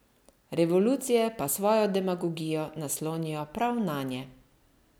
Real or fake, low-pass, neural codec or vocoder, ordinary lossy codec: real; none; none; none